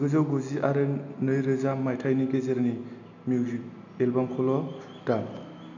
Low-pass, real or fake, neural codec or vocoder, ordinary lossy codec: 7.2 kHz; real; none; none